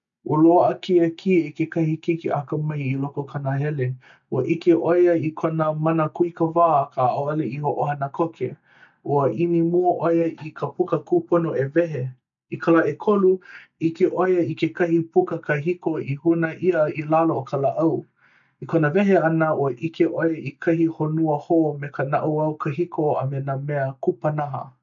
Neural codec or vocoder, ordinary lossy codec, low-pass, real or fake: none; none; 7.2 kHz; real